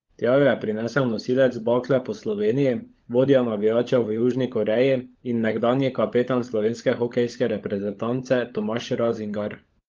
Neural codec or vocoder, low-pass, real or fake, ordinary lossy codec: codec, 16 kHz, 16 kbps, FunCodec, trained on LibriTTS, 50 frames a second; 7.2 kHz; fake; Opus, 32 kbps